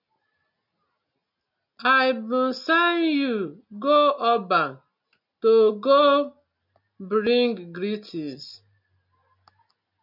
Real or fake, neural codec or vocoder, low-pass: real; none; 5.4 kHz